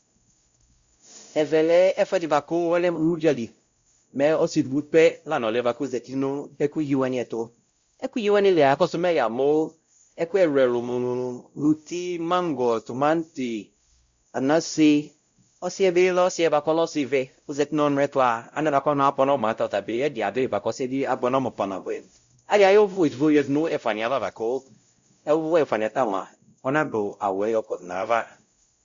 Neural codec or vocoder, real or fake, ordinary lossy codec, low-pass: codec, 16 kHz, 0.5 kbps, X-Codec, WavLM features, trained on Multilingual LibriSpeech; fake; Opus, 64 kbps; 7.2 kHz